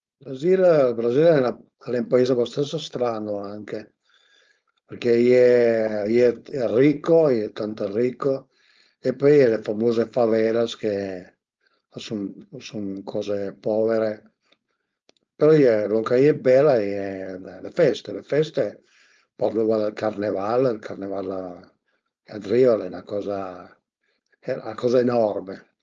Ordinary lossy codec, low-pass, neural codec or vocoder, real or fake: Opus, 24 kbps; 7.2 kHz; codec, 16 kHz, 4.8 kbps, FACodec; fake